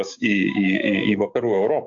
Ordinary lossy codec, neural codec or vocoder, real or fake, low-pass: AAC, 64 kbps; codec, 16 kHz, 6 kbps, DAC; fake; 7.2 kHz